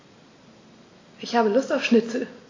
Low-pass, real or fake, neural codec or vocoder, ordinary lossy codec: 7.2 kHz; real; none; AAC, 32 kbps